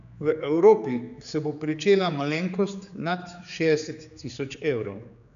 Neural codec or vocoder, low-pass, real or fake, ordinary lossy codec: codec, 16 kHz, 4 kbps, X-Codec, HuBERT features, trained on general audio; 7.2 kHz; fake; none